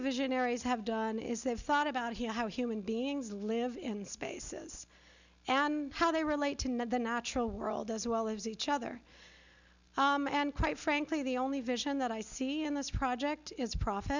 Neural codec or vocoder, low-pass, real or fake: none; 7.2 kHz; real